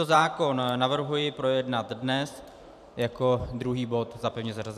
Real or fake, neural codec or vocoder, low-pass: fake; vocoder, 44.1 kHz, 128 mel bands every 512 samples, BigVGAN v2; 14.4 kHz